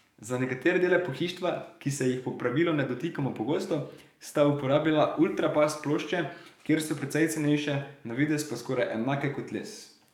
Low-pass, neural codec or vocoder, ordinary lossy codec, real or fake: 19.8 kHz; codec, 44.1 kHz, 7.8 kbps, DAC; none; fake